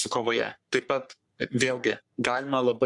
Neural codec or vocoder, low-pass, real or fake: codec, 44.1 kHz, 3.4 kbps, Pupu-Codec; 10.8 kHz; fake